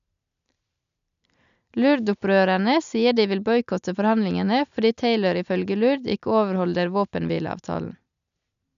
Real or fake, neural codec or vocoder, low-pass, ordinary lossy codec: real; none; 7.2 kHz; none